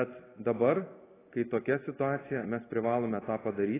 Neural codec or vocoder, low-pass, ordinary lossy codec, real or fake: none; 3.6 kHz; AAC, 16 kbps; real